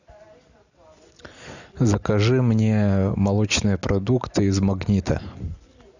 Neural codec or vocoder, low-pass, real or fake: none; 7.2 kHz; real